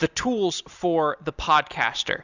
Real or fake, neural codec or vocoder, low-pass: real; none; 7.2 kHz